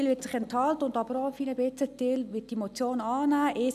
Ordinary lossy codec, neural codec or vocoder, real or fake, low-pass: none; none; real; 14.4 kHz